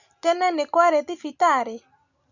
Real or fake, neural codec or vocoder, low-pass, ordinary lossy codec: real; none; 7.2 kHz; none